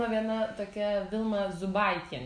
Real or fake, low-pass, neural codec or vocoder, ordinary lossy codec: real; 9.9 kHz; none; MP3, 64 kbps